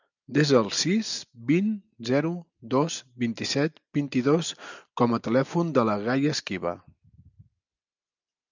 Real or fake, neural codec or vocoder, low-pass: real; none; 7.2 kHz